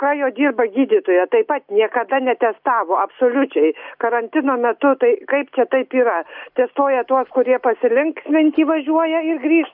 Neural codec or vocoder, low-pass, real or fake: none; 5.4 kHz; real